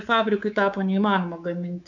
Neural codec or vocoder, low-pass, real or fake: codec, 24 kHz, 3.1 kbps, DualCodec; 7.2 kHz; fake